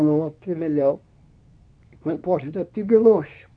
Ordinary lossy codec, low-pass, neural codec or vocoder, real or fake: none; 9.9 kHz; codec, 24 kHz, 0.9 kbps, WavTokenizer, medium speech release version 2; fake